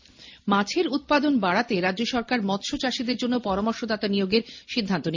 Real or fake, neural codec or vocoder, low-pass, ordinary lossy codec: real; none; 7.2 kHz; MP3, 48 kbps